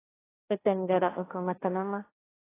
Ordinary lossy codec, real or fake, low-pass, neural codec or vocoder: AAC, 24 kbps; fake; 3.6 kHz; codec, 16 kHz, 1.1 kbps, Voila-Tokenizer